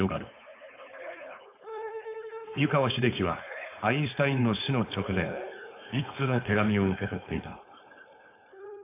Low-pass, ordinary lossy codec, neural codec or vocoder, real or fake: 3.6 kHz; AAC, 24 kbps; codec, 16 kHz, 4.8 kbps, FACodec; fake